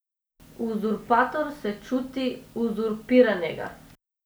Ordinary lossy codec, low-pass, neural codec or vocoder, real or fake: none; none; none; real